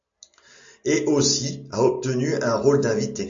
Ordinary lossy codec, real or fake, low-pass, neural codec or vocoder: AAC, 64 kbps; real; 7.2 kHz; none